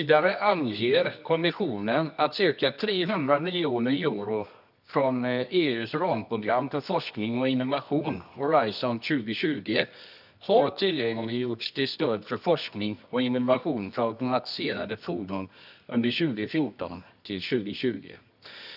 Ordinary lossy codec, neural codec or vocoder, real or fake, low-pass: none; codec, 24 kHz, 0.9 kbps, WavTokenizer, medium music audio release; fake; 5.4 kHz